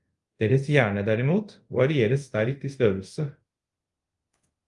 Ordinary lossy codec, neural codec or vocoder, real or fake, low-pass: Opus, 32 kbps; codec, 24 kHz, 0.5 kbps, DualCodec; fake; 10.8 kHz